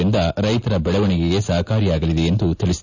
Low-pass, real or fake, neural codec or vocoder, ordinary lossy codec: 7.2 kHz; real; none; none